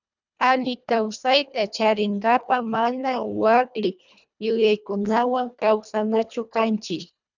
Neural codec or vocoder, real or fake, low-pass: codec, 24 kHz, 1.5 kbps, HILCodec; fake; 7.2 kHz